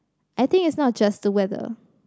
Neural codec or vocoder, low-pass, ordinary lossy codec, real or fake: none; none; none; real